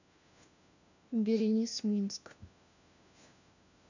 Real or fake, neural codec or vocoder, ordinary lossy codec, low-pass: fake; codec, 16 kHz, 1 kbps, FunCodec, trained on LibriTTS, 50 frames a second; none; 7.2 kHz